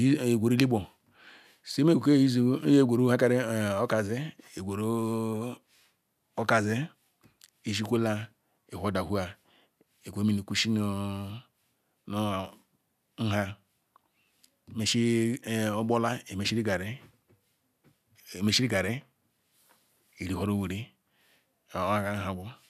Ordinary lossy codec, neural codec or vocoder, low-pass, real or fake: none; none; 14.4 kHz; real